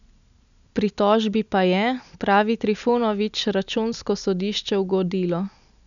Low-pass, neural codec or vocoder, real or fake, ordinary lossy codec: 7.2 kHz; none; real; none